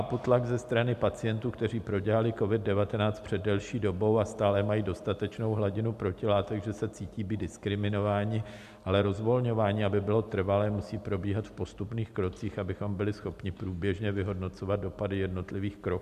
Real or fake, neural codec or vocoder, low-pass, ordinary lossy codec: real; none; 14.4 kHz; MP3, 96 kbps